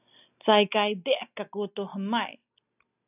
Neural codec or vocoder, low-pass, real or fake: none; 3.6 kHz; real